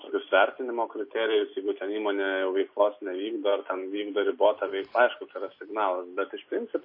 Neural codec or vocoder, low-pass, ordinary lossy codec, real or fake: none; 5.4 kHz; MP3, 24 kbps; real